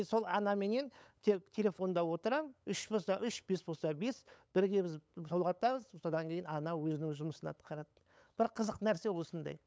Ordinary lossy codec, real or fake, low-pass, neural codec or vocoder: none; fake; none; codec, 16 kHz, 8 kbps, FunCodec, trained on LibriTTS, 25 frames a second